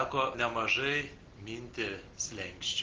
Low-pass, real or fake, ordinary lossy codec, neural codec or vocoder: 7.2 kHz; real; Opus, 16 kbps; none